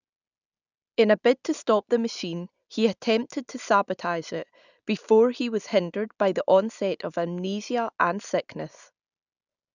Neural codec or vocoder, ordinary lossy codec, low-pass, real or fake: none; none; 7.2 kHz; real